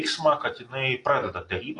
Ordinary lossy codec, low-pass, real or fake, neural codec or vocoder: AAC, 32 kbps; 10.8 kHz; real; none